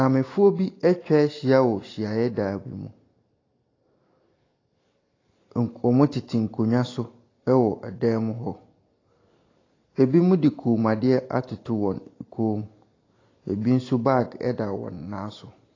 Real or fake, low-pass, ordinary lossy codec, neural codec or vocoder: real; 7.2 kHz; AAC, 32 kbps; none